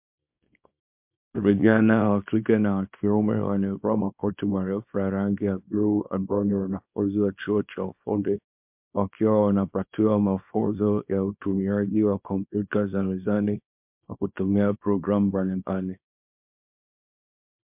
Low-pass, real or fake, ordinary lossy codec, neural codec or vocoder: 3.6 kHz; fake; MP3, 32 kbps; codec, 24 kHz, 0.9 kbps, WavTokenizer, small release